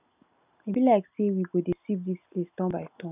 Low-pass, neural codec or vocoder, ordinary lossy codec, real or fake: 3.6 kHz; none; none; real